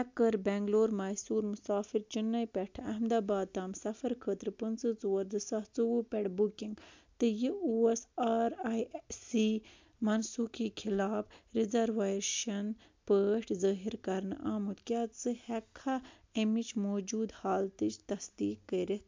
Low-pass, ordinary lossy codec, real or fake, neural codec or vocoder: 7.2 kHz; MP3, 64 kbps; real; none